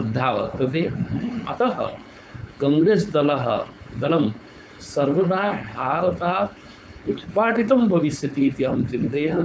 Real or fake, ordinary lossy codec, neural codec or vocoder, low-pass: fake; none; codec, 16 kHz, 4.8 kbps, FACodec; none